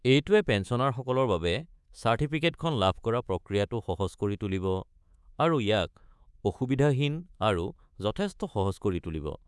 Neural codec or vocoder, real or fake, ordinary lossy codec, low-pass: codec, 24 kHz, 3.1 kbps, DualCodec; fake; none; none